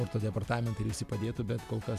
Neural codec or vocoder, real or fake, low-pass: none; real; 14.4 kHz